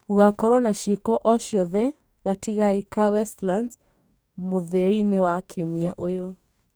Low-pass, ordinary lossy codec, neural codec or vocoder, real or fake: none; none; codec, 44.1 kHz, 2.6 kbps, DAC; fake